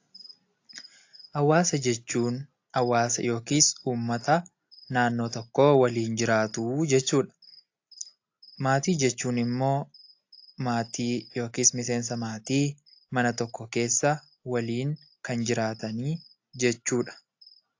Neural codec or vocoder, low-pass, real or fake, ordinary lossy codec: none; 7.2 kHz; real; AAC, 48 kbps